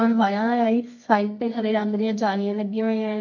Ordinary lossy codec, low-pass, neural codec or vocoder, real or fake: MP3, 48 kbps; 7.2 kHz; codec, 24 kHz, 0.9 kbps, WavTokenizer, medium music audio release; fake